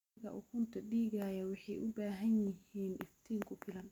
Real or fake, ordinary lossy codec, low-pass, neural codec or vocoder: real; MP3, 96 kbps; 19.8 kHz; none